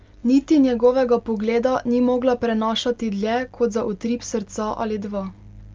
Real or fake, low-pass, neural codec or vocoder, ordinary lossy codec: real; 7.2 kHz; none; Opus, 32 kbps